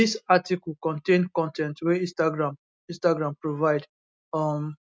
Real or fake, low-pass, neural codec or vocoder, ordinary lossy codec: real; none; none; none